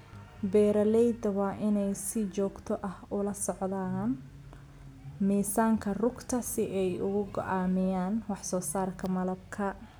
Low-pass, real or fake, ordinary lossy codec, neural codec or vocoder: none; real; none; none